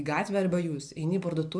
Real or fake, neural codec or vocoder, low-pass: real; none; 9.9 kHz